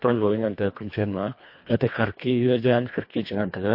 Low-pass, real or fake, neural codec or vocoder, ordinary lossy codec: 5.4 kHz; fake; codec, 24 kHz, 1.5 kbps, HILCodec; MP3, 32 kbps